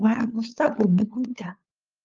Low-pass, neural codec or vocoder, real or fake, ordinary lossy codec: 7.2 kHz; codec, 16 kHz, 2 kbps, FunCodec, trained on LibriTTS, 25 frames a second; fake; Opus, 32 kbps